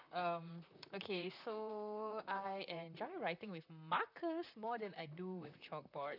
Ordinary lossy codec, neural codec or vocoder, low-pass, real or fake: none; vocoder, 44.1 kHz, 128 mel bands, Pupu-Vocoder; 5.4 kHz; fake